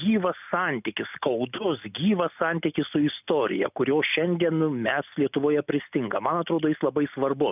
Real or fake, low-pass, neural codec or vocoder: real; 3.6 kHz; none